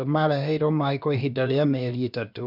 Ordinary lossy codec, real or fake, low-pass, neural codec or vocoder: none; fake; 5.4 kHz; codec, 16 kHz, about 1 kbps, DyCAST, with the encoder's durations